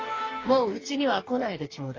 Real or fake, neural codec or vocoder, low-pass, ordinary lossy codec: fake; codec, 44.1 kHz, 2.6 kbps, DAC; 7.2 kHz; AAC, 32 kbps